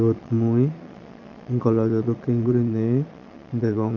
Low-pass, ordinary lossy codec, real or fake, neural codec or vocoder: 7.2 kHz; none; fake; vocoder, 22.05 kHz, 80 mel bands, Vocos